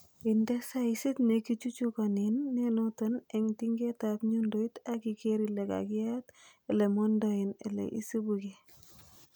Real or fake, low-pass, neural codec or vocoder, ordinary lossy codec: real; none; none; none